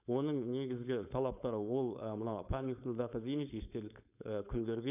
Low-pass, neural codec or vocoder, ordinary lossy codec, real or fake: 3.6 kHz; codec, 16 kHz, 4.8 kbps, FACodec; none; fake